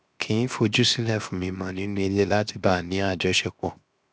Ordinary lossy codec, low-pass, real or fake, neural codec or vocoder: none; none; fake; codec, 16 kHz, 0.7 kbps, FocalCodec